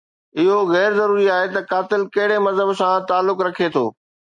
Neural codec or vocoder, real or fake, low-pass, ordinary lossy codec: none; real; 10.8 kHz; MP3, 64 kbps